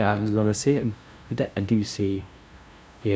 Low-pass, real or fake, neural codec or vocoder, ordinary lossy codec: none; fake; codec, 16 kHz, 0.5 kbps, FunCodec, trained on LibriTTS, 25 frames a second; none